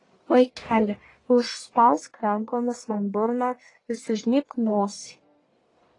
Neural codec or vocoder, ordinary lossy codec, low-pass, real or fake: codec, 44.1 kHz, 1.7 kbps, Pupu-Codec; AAC, 32 kbps; 10.8 kHz; fake